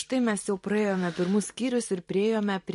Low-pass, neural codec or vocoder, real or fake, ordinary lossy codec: 14.4 kHz; vocoder, 44.1 kHz, 128 mel bands every 512 samples, BigVGAN v2; fake; MP3, 48 kbps